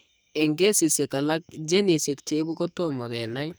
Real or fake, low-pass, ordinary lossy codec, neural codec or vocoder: fake; none; none; codec, 44.1 kHz, 2.6 kbps, SNAC